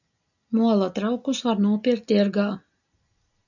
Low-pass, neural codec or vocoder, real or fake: 7.2 kHz; none; real